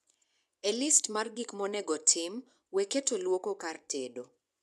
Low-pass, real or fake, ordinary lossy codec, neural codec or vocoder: none; fake; none; vocoder, 24 kHz, 100 mel bands, Vocos